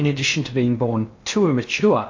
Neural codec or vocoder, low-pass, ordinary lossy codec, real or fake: codec, 16 kHz in and 24 kHz out, 0.8 kbps, FocalCodec, streaming, 65536 codes; 7.2 kHz; AAC, 48 kbps; fake